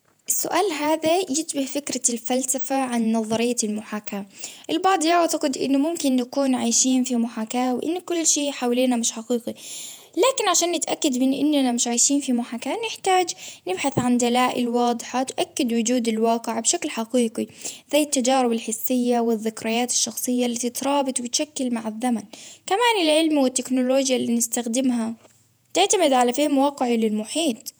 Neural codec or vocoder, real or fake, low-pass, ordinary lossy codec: vocoder, 48 kHz, 128 mel bands, Vocos; fake; none; none